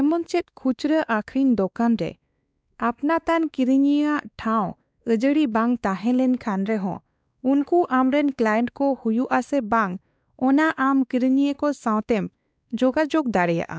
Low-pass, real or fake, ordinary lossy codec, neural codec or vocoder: none; fake; none; codec, 16 kHz, 2 kbps, X-Codec, WavLM features, trained on Multilingual LibriSpeech